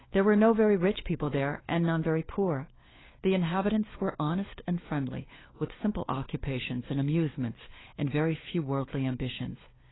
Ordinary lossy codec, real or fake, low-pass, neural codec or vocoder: AAC, 16 kbps; fake; 7.2 kHz; codec, 16 kHz, 4 kbps, FunCodec, trained on LibriTTS, 50 frames a second